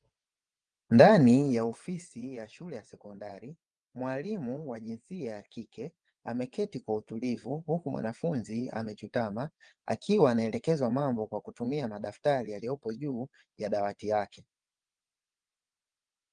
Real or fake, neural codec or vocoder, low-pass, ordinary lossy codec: fake; vocoder, 22.05 kHz, 80 mel bands, WaveNeXt; 9.9 kHz; Opus, 32 kbps